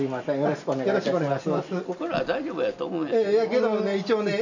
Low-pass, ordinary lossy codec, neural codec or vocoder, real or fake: 7.2 kHz; none; none; real